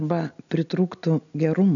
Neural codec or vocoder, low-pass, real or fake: none; 7.2 kHz; real